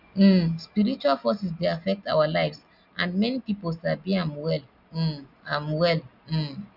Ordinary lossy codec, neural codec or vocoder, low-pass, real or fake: none; none; 5.4 kHz; real